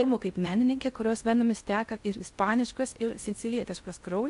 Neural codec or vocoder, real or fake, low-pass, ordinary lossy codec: codec, 16 kHz in and 24 kHz out, 0.6 kbps, FocalCodec, streaming, 4096 codes; fake; 10.8 kHz; AAC, 64 kbps